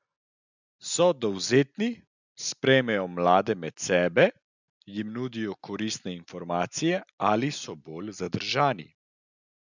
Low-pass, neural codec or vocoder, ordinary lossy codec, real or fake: 7.2 kHz; none; none; real